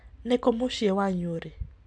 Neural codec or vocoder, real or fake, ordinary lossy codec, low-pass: none; real; AAC, 64 kbps; 9.9 kHz